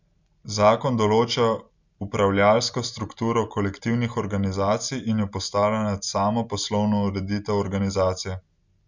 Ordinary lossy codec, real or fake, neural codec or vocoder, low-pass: Opus, 64 kbps; real; none; 7.2 kHz